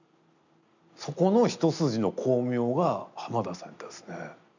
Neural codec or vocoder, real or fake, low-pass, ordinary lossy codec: none; real; 7.2 kHz; none